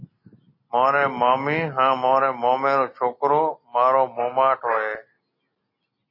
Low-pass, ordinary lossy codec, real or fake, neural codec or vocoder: 5.4 kHz; MP3, 24 kbps; real; none